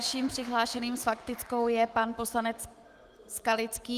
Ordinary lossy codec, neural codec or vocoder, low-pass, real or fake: Opus, 24 kbps; autoencoder, 48 kHz, 128 numbers a frame, DAC-VAE, trained on Japanese speech; 14.4 kHz; fake